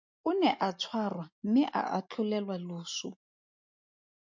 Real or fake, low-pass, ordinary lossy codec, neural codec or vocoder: real; 7.2 kHz; MP3, 48 kbps; none